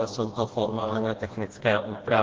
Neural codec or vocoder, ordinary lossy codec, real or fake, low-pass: codec, 16 kHz, 1 kbps, FreqCodec, smaller model; Opus, 16 kbps; fake; 7.2 kHz